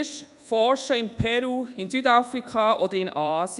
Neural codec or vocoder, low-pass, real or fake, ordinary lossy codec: codec, 24 kHz, 1.2 kbps, DualCodec; 10.8 kHz; fake; none